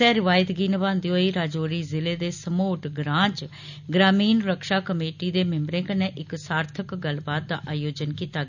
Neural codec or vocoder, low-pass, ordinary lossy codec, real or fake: none; 7.2 kHz; none; real